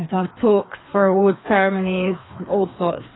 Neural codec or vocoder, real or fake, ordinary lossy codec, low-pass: codec, 16 kHz, 2 kbps, FreqCodec, larger model; fake; AAC, 16 kbps; 7.2 kHz